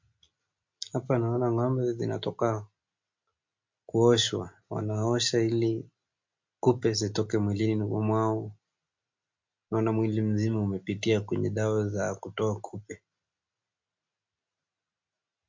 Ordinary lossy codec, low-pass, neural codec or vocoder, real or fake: MP3, 48 kbps; 7.2 kHz; none; real